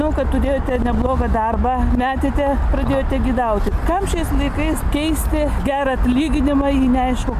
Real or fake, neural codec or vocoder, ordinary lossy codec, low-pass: real; none; MP3, 96 kbps; 14.4 kHz